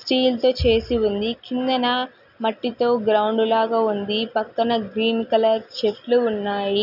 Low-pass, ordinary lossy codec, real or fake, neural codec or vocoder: 5.4 kHz; none; real; none